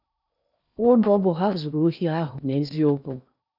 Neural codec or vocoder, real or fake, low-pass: codec, 16 kHz in and 24 kHz out, 0.8 kbps, FocalCodec, streaming, 65536 codes; fake; 5.4 kHz